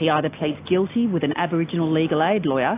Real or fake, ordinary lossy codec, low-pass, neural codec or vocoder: real; AAC, 24 kbps; 3.6 kHz; none